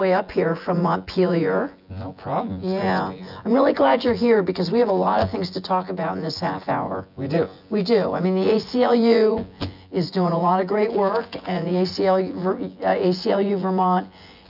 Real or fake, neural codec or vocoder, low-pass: fake; vocoder, 24 kHz, 100 mel bands, Vocos; 5.4 kHz